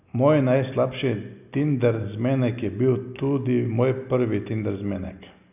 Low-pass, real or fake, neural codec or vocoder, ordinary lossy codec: 3.6 kHz; real; none; none